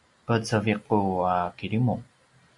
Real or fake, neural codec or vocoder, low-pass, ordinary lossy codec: real; none; 10.8 kHz; MP3, 48 kbps